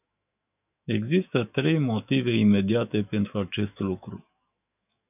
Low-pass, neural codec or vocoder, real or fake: 3.6 kHz; autoencoder, 48 kHz, 128 numbers a frame, DAC-VAE, trained on Japanese speech; fake